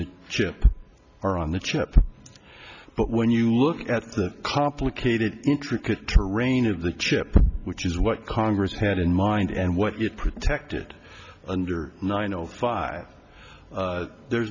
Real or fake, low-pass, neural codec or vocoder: real; 7.2 kHz; none